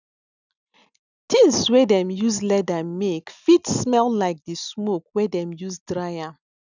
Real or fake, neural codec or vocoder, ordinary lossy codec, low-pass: real; none; none; 7.2 kHz